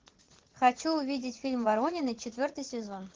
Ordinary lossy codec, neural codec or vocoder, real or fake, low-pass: Opus, 16 kbps; vocoder, 44.1 kHz, 80 mel bands, Vocos; fake; 7.2 kHz